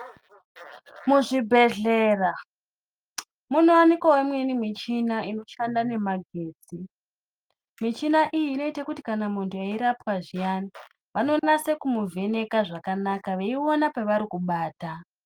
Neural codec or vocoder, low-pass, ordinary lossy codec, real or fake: none; 19.8 kHz; Opus, 32 kbps; real